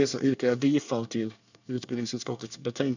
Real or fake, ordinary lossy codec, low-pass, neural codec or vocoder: fake; none; 7.2 kHz; codec, 24 kHz, 1 kbps, SNAC